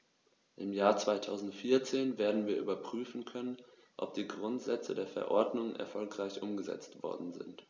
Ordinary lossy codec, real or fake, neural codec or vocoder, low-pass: none; real; none; none